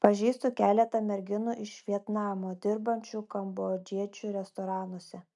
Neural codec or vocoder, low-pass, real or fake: none; 10.8 kHz; real